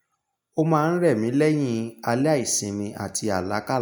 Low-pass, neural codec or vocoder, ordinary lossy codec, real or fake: none; none; none; real